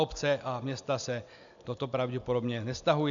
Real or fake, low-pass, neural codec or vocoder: real; 7.2 kHz; none